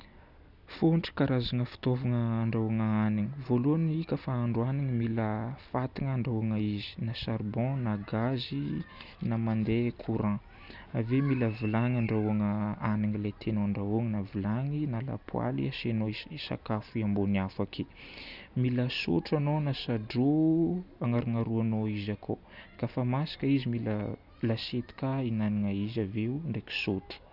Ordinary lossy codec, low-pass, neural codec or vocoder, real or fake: none; 5.4 kHz; none; real